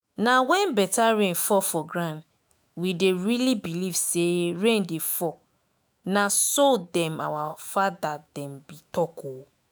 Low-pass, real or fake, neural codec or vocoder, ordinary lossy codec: none; fake; autoencoder, 48 kHz, 128 numbers a frame, DAC-VAE, trained on Japanese speech; none